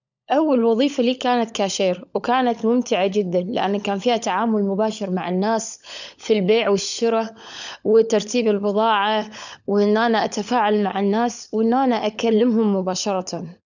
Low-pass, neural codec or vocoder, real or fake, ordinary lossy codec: 7.2 kHz; codec, 16 kHz, 16 kbps, FunCodec, trained on LibriTTS, 50 frames a second; fake; none